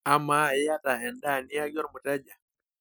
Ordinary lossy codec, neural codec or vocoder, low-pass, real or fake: none; none; none; real